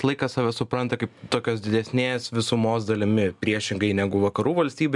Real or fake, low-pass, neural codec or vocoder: real; 10.8 kHz; none